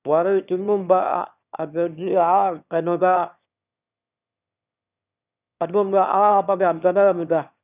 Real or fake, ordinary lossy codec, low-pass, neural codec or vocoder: fake; none; 3.6 kHz; autoencoder, 22.05 kHz, a latent of 192 numbers a frame, VITS, trained on one speaker